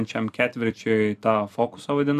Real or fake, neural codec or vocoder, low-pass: fake; vocoder, 44.1 kHz, 128 mel bands every 256 samples, BigVGAN v2; 14.4 kHz